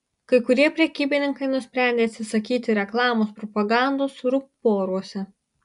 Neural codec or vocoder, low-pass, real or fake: none; 10.8 kHz; real